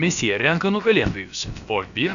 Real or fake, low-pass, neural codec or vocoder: fake; 7.2 kHz; codec, 16 kHz, about 1 kbps, DyCAST, with the encoder's durations